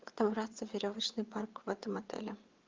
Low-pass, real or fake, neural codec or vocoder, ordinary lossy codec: 7.2 kHz; fake; vocoder, 22.05 kHz, 80 mel bands, WaveNeXt; Opus, 32 kbps